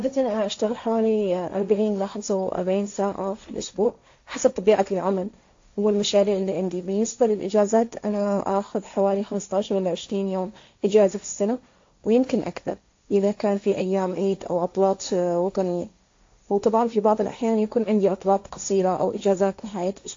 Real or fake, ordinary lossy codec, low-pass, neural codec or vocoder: fake; AAC, 48 kbps; 7.2 kHz; codec, 16 kHz, 1.1 kbps, Voila-Tokenizer